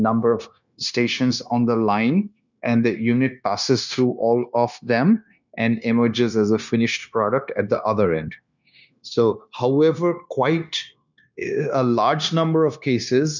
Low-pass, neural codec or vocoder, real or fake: 7.2 kHz; codec, 16 kHz, 0.9 kbps, LongCat-Audio-Codec; fake